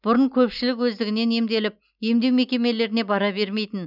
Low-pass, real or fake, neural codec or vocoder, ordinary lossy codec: 5.4 kHz; real; none; none